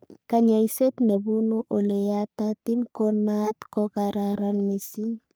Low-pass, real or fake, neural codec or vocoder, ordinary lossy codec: none; fake; codec, 44.1 kHz, 3.4 kbps, Pupu-Codec; none